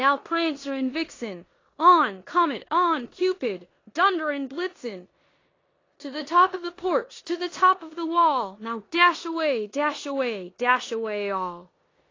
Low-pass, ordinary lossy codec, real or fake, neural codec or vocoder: 7.2 kHz; AAC, 32 kbps; fake; codec, 16 kHz in and 24 kHz out, 0.9 kbps, LongCat-Audio-Codec, four codebook decoder